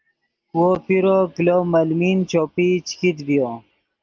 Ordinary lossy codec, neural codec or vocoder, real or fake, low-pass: Opus, 32 kbps; none; real; 7.2 kHz